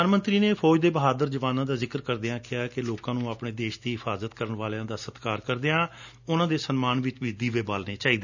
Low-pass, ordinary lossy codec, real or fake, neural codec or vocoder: 7.2 kHz; none; real; none